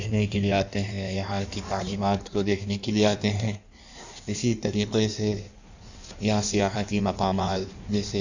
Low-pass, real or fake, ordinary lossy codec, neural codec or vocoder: 7.2 kHz; fake; none; codec, 16 kHz in and 24 kHz out, 1.1 kbps, FireRedTTS-2 codec